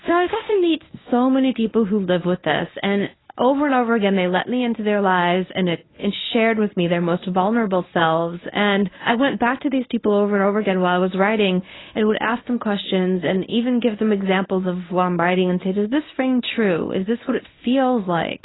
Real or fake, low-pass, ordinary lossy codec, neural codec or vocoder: fake; 7.2 kHz; AAC, 16 kbps; codec, 24 kHz, 0.9 kbps, WavTokenizer, medium speech release version 1